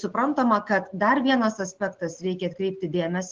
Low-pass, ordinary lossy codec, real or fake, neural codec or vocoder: 7.2 kHz; Opus, 16 kbps; real; none